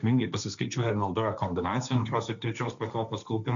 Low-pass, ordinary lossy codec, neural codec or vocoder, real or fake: 7.2 kHz; MP3, 96 kbps; codec, 16 kHz, 1.1 kbps, Voila-Tokenizer; fake